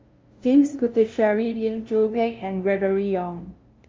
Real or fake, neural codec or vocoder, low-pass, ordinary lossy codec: fake; codec, 16 kHz, 0.5 kbps, FunCodec, trained on LibriTTS, 25 frames a second; 7.2 kHz; Opus, 32 kbps